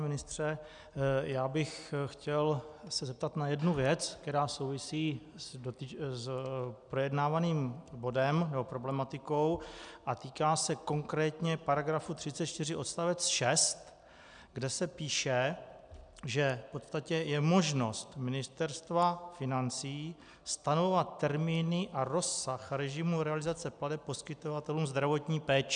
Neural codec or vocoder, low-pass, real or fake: none; 9.9 kHz; real